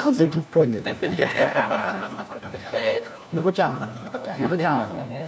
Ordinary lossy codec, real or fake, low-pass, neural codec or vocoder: none; fake; none; codec, 16 kHz, 1 kbps, FunCodec, trained on LibriTTS, 50 frames a second